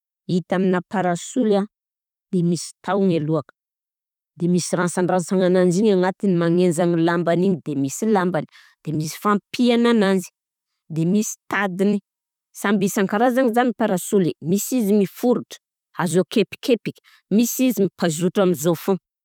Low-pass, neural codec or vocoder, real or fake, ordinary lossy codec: 19.8 kHz; vocoder, 44.1 kHz, 128 mel bands every 256 samples, BigVGAN v2; fake; none